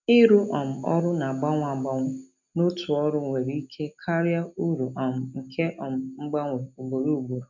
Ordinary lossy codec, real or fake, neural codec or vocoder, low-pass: none; real; none; 7.2 kHz